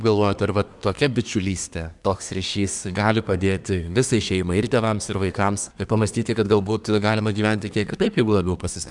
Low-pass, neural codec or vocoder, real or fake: 10.8 kHz; codec, 24 kHz, 1 kbps, SNAC; fake